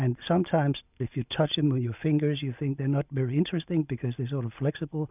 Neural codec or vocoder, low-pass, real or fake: none; 3.6 kHz; real